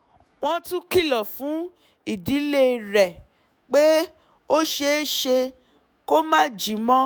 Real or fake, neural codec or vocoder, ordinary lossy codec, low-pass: fake; autoencoder, 48 kHz, 128 numbers a frame, DAC-VAE, trained on Japanese speech; none; none